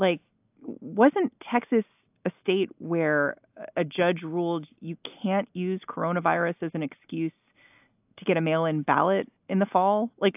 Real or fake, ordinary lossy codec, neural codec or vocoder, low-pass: real; AAC, 32 kbps; none; 3.6 kHz